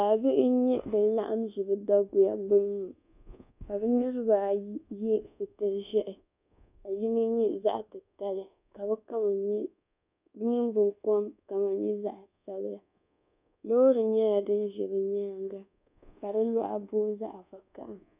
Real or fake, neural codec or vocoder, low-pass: fake; codec, 24 kHz, 1.2 kbps, DualCodec; 3.6 kHz